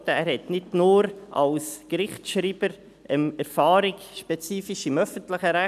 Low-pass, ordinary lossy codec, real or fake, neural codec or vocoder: 14.4 kHz; none; real; none